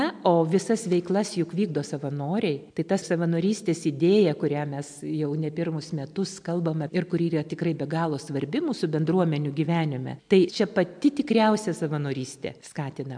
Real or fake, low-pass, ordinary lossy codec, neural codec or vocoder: real; 9.9 kHz; MP3, 64 kbps; none